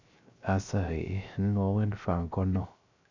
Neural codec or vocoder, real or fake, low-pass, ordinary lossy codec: codec, 16 kHz, 0.3 kbps, FocalCodec; fake; 7.2 kHz; MP3, 64 kbps